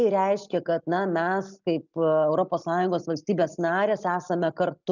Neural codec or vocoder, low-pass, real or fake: none; 7.2 kHz; real